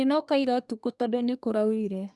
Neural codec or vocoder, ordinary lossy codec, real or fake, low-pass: codec, 24 kHz, 1 kbps, SNAC; none; fake; none